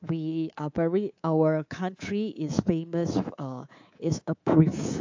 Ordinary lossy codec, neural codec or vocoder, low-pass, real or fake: AAC, 48 kbps; codec, 24 kHz, 3.1 kbps, DualCodec; 7.2 kHz; fake